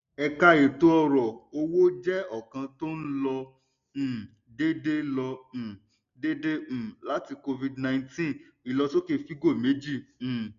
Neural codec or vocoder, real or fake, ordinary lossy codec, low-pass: none; real; none; 7.2 kHz